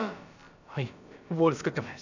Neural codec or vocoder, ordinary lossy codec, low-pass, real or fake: codec, 16 kHz, about 1 kbps, DyCAST, with the encoder's durations; none; 7.2 kHz; fake